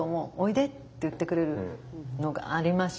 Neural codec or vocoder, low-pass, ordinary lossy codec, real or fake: none; none; none; real